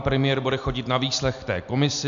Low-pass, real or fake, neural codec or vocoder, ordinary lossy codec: 7.2 kHz; real; none; MP3, 96 kbps